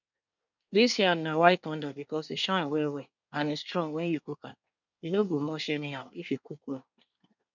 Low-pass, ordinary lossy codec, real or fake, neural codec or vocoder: 7.2 kHz; none; fake; codec, 24 kHz, 1 kbps, SNAC